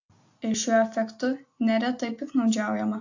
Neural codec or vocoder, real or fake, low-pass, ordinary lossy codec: none; real; 7.2 kHz; AAC, 48 kbps